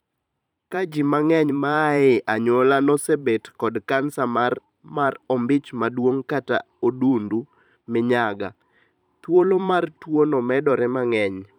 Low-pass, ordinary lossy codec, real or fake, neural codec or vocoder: 19.8 kHz; none; fake; vocoder, 44.1 kHz, 128 mel bands every 512 samples, BigVGAN v2